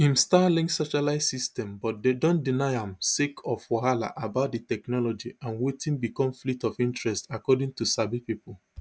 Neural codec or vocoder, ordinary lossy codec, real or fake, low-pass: none; none; real; none